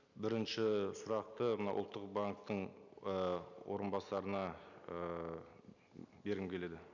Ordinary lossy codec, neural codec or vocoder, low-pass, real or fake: none; none; 7.2 kHz; real